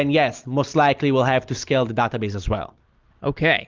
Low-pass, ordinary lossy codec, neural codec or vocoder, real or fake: 7.2 kHz; Opus, 32 kbps; none; real